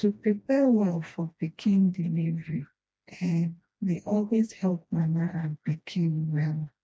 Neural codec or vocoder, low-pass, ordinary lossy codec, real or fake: codec, 16 kHz, 1 kbps, FreqCodec, smaller model; none; none; fake